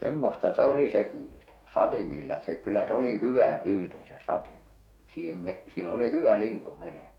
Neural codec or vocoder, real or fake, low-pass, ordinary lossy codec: codec, 44.1 kHz, 2.6 kbps, DAC; fake; 19.8 kHz; none